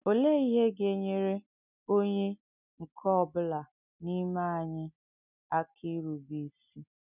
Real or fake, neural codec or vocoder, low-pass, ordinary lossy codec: real; none; 3.6 kHz; none